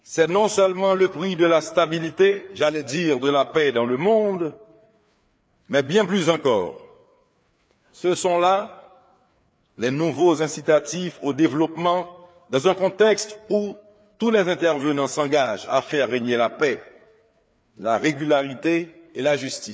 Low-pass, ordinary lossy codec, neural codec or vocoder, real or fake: none; none; codec, 16 kHz, 4 kbps, FreqCodec, larger model; fake